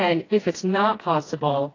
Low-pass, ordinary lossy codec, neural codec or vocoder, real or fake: 7.2 kHz; AAC, 32 kbps; codec, 16 kHz, 1 kbps, FreqCodec, smaller model; fake